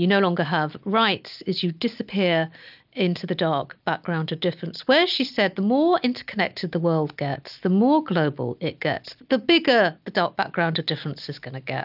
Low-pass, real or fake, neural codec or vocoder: 5.4 kHz; real; none